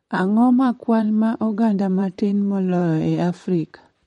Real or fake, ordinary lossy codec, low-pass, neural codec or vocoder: fake; MP3, 48 kbps; 19.8 kHz; vocoder, 44.1 kHz, 128 mel bands, Pupu-Vocoder